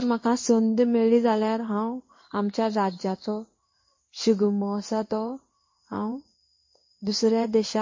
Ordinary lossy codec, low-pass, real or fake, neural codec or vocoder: MP3, 32 kbps; 7.2 kHz; fake; codec, 16 kHz in and 24 kHz out, 1 kbps, XY-Tokenizer